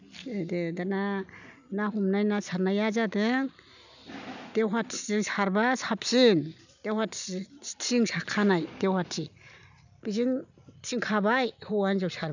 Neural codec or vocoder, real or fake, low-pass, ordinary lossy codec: none; real; 7.2 kHz; none